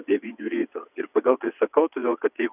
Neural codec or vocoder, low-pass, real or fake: vocoder, 22.05 kHz, 80 mel bands, WaveNeXt; 3.6 kHz; fake